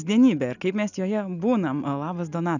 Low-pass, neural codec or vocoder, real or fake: 7.2 kHz; none; real